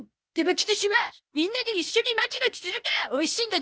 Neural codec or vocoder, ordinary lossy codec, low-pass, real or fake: codec, 16 kHz, 0.8 kbps, ZipCodec; none; none; fake